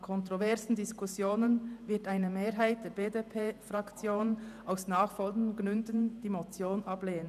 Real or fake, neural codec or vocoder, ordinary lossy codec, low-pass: fake; vocoder, 48 kHz, 128 mel bands, Vocos; none; 14.4 kHz